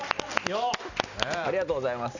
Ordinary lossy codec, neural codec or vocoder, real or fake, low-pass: none; none; real; 7.2 kHz